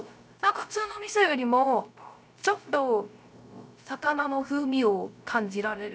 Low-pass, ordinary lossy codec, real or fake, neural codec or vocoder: none; none; fake; codec, 16 kHz, 0.3 kbps, FocalCodec